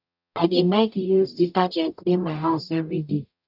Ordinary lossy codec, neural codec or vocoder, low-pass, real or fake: none; codec, 44.1 kHz, 0.9 kbps, DAC; 5.4 kHz; fake